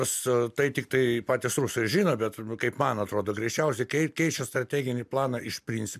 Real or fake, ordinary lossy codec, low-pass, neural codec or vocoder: real; MP3, 96 kbps; 14.4 kHz; none